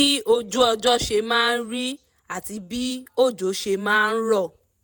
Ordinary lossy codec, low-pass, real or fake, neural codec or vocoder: none; none; fake; vocoder, 48 kHz, 128 mel bands, Vocos